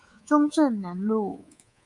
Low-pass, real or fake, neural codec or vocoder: 10.8 kHz; fake; codec, 24 kHz, 3.1 kbps, DualCodec